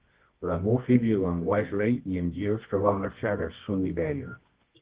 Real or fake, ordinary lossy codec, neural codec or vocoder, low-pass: fake; Opus, 16 kbps; codec, 24 kHz, 0.9 kbps, WavTokenizer, medium music audio release; 3.6 kHz